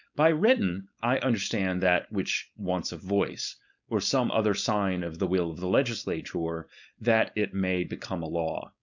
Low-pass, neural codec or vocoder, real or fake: 7.2 kHz; codec, 16 kHz, 4.8 kbps, FACodec; fake